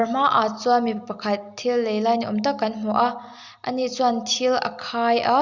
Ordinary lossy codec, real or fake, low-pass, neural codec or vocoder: Opus, 64 kbps; real; 7.2 kHz; none